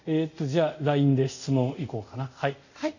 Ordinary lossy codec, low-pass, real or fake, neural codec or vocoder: none; 7.2 kHz; fake; codec, 24 kHz, 0.5 kbps, DualCodec